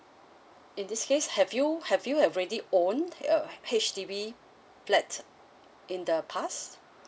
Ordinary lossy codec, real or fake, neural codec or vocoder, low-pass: none; real; none; none